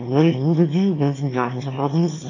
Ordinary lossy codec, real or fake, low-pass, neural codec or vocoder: AAC, 32 kbps; fake; 7.2 kHz; autoencoder, 22.05 kHz, a latent of 192 numbers a frame, VITS, trained on one speaker